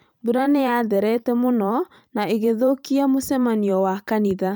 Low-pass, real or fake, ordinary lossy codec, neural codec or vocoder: none; fake; none; vocoder, 44.1 kHz, 128 mel bands every 512 samples, BigVGAN v2